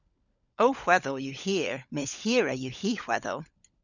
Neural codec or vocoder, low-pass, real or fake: codec, 16 kHz, 16 kbps, FunCodec, trained on LibriTTS, 50 frames a second; 7.2 kHz; fake